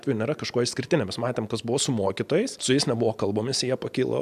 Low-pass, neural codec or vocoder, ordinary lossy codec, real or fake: 14.4 kHz; none; AAC, 96 kbps; real